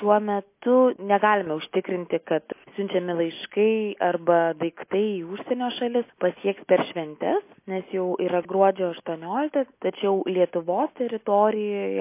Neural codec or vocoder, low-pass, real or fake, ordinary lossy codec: none; 3.6 kHz; real; MP3, 24 kbps